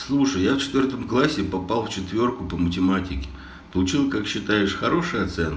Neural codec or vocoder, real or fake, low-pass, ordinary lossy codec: none; real; none; none